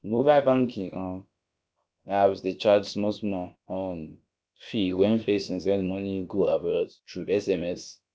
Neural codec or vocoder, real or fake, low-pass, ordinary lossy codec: codec, 16 kHz, about 1 kbps, DyCAST, with the encoder's durations; fake; none; none